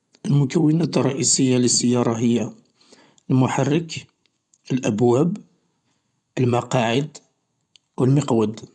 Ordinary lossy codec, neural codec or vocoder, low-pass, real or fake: none; vocoder, 24 kHz, 100 mel bands, Vocos; 10.8 kHz; fake